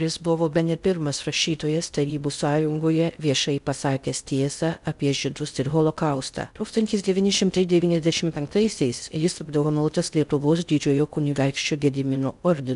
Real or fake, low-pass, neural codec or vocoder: fake; 10.8 kHz; codec, 16 kHz in and 24 kHz out, 0.6 kbps, FocalCodec, streaming, 2048 codes